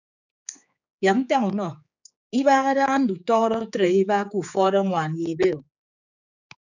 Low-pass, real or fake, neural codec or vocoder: 7.2 kHz; fake; codec, 16 kHz, 4 kbps, X-Codec, HuBERT features, trained on general audio